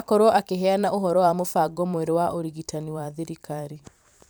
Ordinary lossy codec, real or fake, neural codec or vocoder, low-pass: none; fake; vocoder, 44.1 kHz, 128 mel bands every 512 samples, BigVGAN v2; none